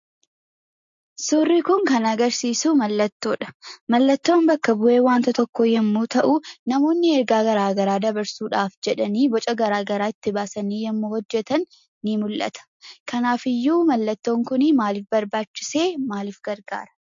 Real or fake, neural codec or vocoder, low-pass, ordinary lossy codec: real; none; 7.2 kHz; MP3, 48 kbps